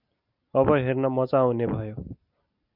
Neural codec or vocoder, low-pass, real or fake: none; 5.4 kHz; real